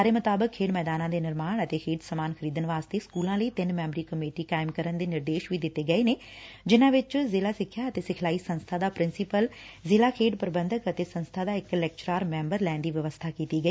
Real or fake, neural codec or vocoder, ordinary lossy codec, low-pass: real; none; none; none